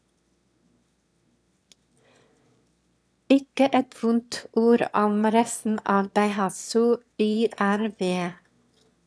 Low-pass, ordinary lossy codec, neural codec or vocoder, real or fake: none; none; autoencoder, 22.05 kHz, a latent of 192 numbers a frame, VITS, trained on one speaker; fake